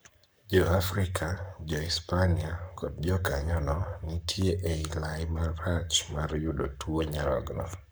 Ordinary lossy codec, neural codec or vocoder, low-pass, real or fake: none; codec, 44.1 kHz, 7.8 kbps, Pupu-Codec; none; fake